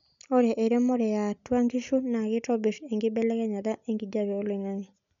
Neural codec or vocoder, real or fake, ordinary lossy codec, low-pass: none; real; none; 7.2 kHz